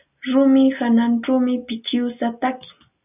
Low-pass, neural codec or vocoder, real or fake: 3.6 kHz; none; real